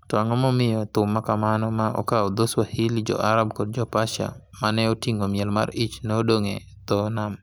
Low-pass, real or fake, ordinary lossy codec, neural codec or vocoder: none; real; none; none